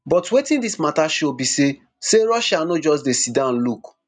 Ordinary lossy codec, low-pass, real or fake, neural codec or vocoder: none; 9.9 kHz; real; none